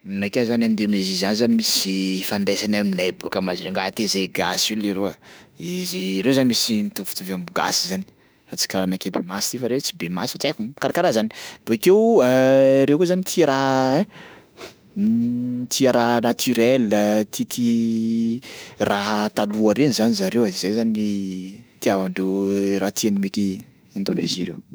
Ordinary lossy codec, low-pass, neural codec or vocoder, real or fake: none; none; autoencoder, 48 kHz, 32 numbers a frame, DAC-VAE, trained on Japanese speech; fake